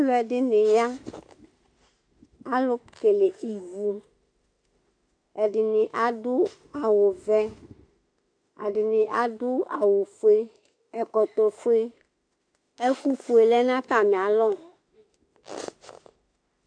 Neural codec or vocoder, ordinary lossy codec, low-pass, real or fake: autoencoder, 48 kHz, 32 numbers a frame, DAC-VAE, trained on Japanese speech; AAC, 64 kbps; 9.9 kHz; fake